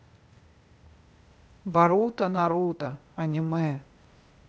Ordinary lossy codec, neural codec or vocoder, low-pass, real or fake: none; codec, 16 kHz, 0.8 kbps, ZipCodec; none; fake